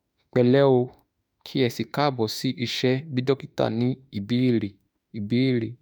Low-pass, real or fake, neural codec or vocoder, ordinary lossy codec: none; fake; autoencoder, 48 kHz, 32 numbers a frame, DAC-VAE, trained on Japanese speech; none